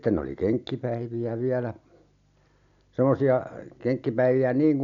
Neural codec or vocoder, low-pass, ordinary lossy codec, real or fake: none; 7.2 kHz; none; real